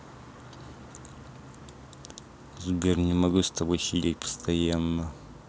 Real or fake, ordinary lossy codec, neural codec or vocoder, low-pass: real; none; none; none